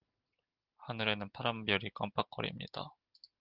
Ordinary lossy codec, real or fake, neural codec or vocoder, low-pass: Opus, 32 kbps; real; none; 5.4 kHz